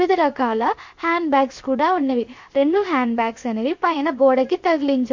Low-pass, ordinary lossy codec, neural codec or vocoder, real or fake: 7.2 kHz; MP3, 48 kbps; codec, 16 kHz, 0.7 kbps, FocalCodec; fake